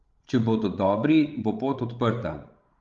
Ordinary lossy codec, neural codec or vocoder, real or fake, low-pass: Opus, 32 kbps; codec, 16 kHz, 16 kbps, FreqCodec, larger model; fake; 7.2 kHz